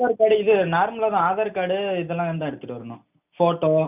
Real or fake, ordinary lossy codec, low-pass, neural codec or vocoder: real; none; 3.6 kHz; none